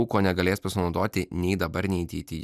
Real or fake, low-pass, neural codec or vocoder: real; 14.4 kHz; none